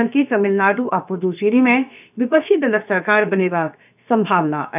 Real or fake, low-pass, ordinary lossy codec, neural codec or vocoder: fake; 3.6 kHz; none; codec, 16 kHz, about 1 kbps, DyCAST, with the encoder's durations